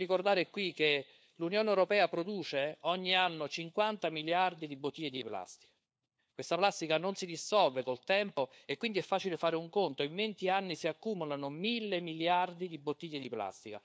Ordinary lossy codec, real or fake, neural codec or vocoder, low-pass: none; fake; codec, 16 kHz, 4 kbps, FunCodec, trained on LibriTTS, 50 frames a second; none